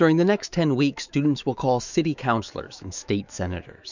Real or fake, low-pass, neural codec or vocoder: fake; 7.2 kHz; autoencoder, 48 kHz, 128 numbers a frame, DAC-VAE, trained on Japanese speech